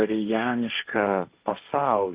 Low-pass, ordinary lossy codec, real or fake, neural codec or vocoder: 3.6 kHz; Opus, 24 kbps; fake; codec, 16 kHz, 1.1 kbps, Voila-Tokenizer